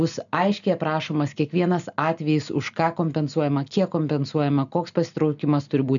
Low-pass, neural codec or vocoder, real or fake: 7.2 kHz; none; real